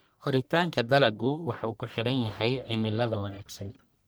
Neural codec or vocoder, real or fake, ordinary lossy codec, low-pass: codec, 44.1 kHz, 1.7 kbps, Pupu-Codec; fake; none; none